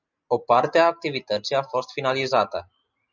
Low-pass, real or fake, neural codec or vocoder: 7.2 kHz; real; none